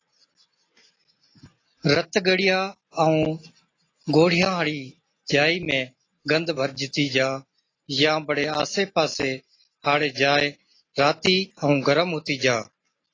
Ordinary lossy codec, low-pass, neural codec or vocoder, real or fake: AAC, 32 kbps; 7.2 kHz; none; real